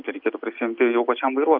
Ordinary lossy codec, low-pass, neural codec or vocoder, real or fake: AAC, 48 kbps; 5.4 kHz; none; real